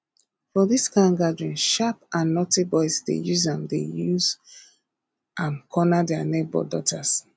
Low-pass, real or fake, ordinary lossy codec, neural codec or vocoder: none; real; none; none